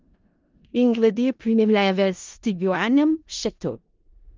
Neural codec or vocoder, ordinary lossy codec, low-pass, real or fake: codec, 16 kHz in and 24 kHz out, 0.4 kbps, LongCat-Audio-Codec, four codebook decoder; Opus, 32 kbps; 7.2 kHz; fake